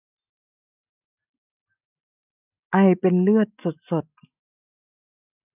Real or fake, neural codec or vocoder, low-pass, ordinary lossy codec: real; none; 3.6 kHz; none